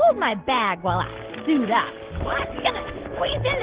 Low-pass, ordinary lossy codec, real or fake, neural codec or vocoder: 3.6 kHz; Opus, 16 kbps; real; none